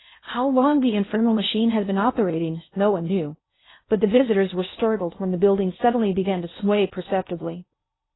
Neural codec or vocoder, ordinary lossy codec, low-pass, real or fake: codec, 16 kHz in and 24 kHz out, 0.6 kbps, FocalCodec, streaming, 4096 codes; AAC, 16 kbps; 7.2 kHz; fake